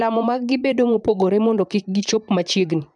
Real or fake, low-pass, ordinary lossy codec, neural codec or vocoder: fake; 10.8 kHz; MP3, 96 kbps; vocoder, 44.1 kHz, 128 mel bands, Pupu-Vocoder